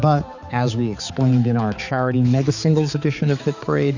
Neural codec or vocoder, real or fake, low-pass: codec, 16 kHz, 4 kbps, X-Codec, HuBERT features, trained on balanced general audio; fake; 7.2 kHz